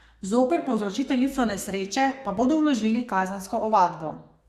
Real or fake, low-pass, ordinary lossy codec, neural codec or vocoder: fake; 14.4 kHz; Opus, 64 kbps; codec, 32 kHz, 1.9 kbps, SNAC